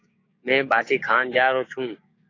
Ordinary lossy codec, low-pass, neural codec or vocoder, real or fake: AAC, 32 kbps; 7.2 kHz; codec, 16 kHz, 6 kbps, DAC; fake